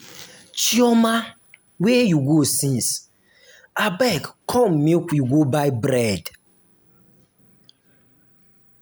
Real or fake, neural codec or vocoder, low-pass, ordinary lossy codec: real; none; none; none